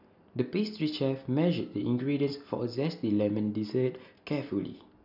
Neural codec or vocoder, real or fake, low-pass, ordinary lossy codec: none; real; 5.4 kHz; none